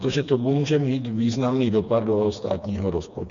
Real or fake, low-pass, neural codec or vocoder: fake; 7.2 kHz; codec, 16 kHz, 2 kbps, FreqCodec, smaller model